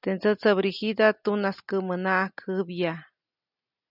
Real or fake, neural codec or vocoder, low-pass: real; none; 5.4 kHz